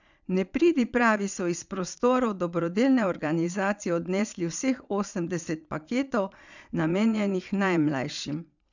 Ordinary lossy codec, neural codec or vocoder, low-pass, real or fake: none; vocoder, 22.05 kHz, 80 mel bands, Vocos; 7.2 kHz; fake